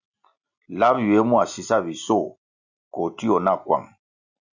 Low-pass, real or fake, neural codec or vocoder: 7.2 kHz; real; none